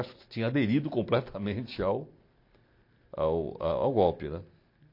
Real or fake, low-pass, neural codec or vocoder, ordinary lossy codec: real; 5.4 kHz; none; MP3, 32 kbps